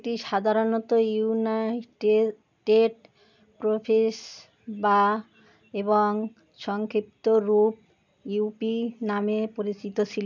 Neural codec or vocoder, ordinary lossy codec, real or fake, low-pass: none; none; real; 7.2 kHz